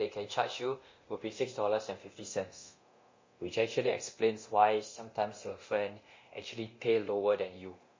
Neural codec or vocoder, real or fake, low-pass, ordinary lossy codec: codec, 24 kHz, 0.9 kbps, DualCodec; fake; 7.2 kHz; MP3, 32 kbps